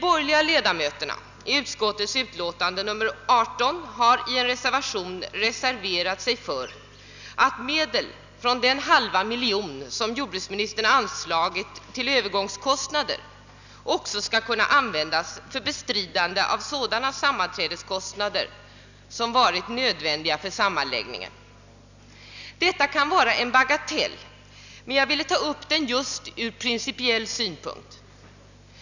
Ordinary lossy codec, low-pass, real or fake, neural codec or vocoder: none; 7.2 kHz; real; none